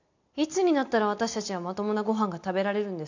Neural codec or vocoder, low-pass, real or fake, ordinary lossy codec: none; 7.2 kHz; real; none